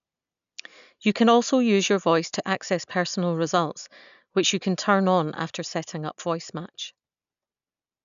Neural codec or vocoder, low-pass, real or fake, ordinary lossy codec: none; 7.2 kHz; real; none